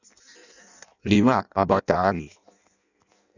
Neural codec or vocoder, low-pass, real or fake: codec, 16 kHz in and 24 kHz out, 0.6 kbps, FireRedTTS-2 codec; 7.2 kHz; fake